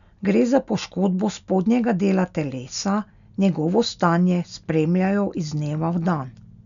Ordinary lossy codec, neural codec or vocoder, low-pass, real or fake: none; none; 7.2 kHz; real